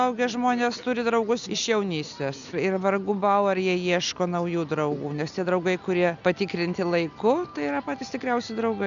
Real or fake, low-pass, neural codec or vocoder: real; 7.2 kHz; none